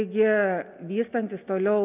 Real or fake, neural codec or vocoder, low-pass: real; none; 3.6 kHz